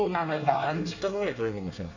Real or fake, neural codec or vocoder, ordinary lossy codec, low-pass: fake; codec, 24 kHz, 1 kbps, SNAC; none; 7.2 kHz